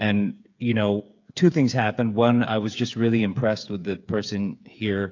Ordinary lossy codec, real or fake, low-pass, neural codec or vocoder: AAC, 48 kbps; fake; 7.2 kHz; codec, 16 kHz, 8 kbps, FreqCodec, smaller model